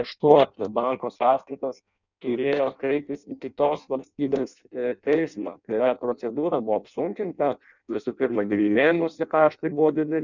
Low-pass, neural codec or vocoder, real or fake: 7.2 kHz; codec, 16 kHz in and 24 kHz out, 0.6 kbps, FireRedTTS-2 codec; fake